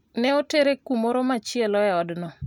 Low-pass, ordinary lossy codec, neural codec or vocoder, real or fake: 19.8 kHz; none; none; real